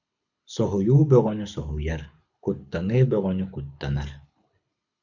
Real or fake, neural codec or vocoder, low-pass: fake; codec, 24 kHz, 6 kbps, HILCodec; 7.2 kHz